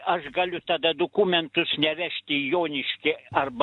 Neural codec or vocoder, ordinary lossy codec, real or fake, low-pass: none; AAC, 64 kbps; real; 10.8 kHz